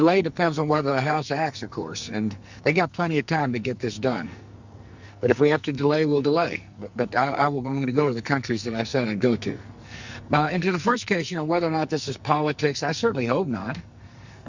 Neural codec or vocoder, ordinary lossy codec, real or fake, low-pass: codec, 44.1 kHz, 2.6 kbps, SNAC; Opus, 64 kbps; fake; 7.2 kHz